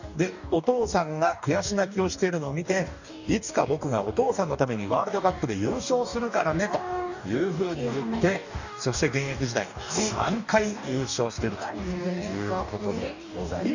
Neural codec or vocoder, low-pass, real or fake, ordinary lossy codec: codec, 44.1 kHz, 2.6 kbps, DAC; 7.2 kHz; fake; none